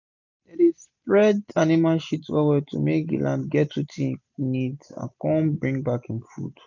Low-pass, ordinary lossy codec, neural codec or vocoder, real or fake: 7.2 kHz; none; none; real